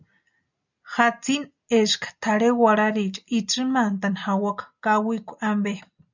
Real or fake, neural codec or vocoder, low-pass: real; none; 7.2 kHz